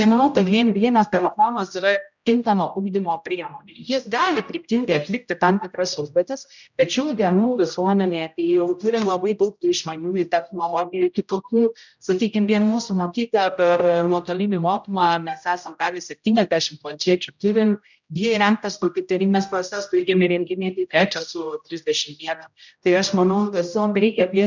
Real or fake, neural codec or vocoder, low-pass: fake; codec, 16 kHz, 0.5 kbps, X-Codec, HuBERT features, trained on general audio; 7.2 kHz